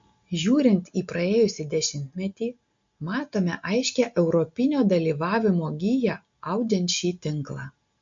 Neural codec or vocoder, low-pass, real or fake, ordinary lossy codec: none; 7.2 kHz; real; MP3, 48 kbps